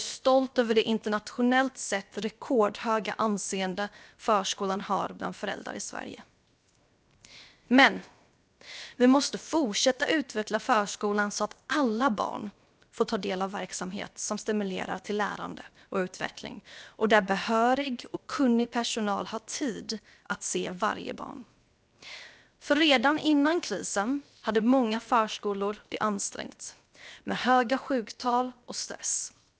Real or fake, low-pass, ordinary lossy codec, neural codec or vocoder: fake; none; none; codec, 16 kHz, 0.7 kbps, FocalCodec